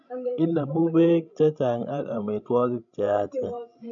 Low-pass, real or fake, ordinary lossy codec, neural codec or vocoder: 7.2 kHz; fake; none; codec, 16 kHz, 16 kbps, FreqCodec, larger model